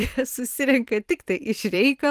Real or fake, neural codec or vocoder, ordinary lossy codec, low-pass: fake; vocoder, 44.1 kHz, 128 mel bands every 256 samples, BigVGAN v2; Opus, 24 kbps; 14.4 kHz